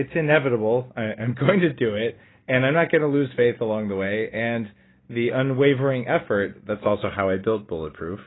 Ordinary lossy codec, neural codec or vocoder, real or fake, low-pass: AAC, 16 kbps; none; real; 7.2 kHz